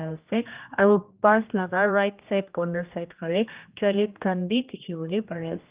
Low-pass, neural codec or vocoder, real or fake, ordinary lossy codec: 3.6 kHz; codec, 16 kHz, 1 kbps, X-Codec, HuBERT features, trained on general audio; fake; Opus, 32 kbps